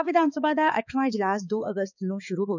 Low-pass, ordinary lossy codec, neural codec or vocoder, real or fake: 7.2 kHz; none; codec, 16 kHz, 4 kbps, X-Codec, HuBERT features, trained on balanced general audio; fake